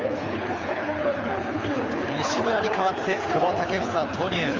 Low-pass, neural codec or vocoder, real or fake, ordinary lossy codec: 7.2 kHz; codec, 24 kHz, 6 kbps, HILCodec; fake; Opus, 32 kbps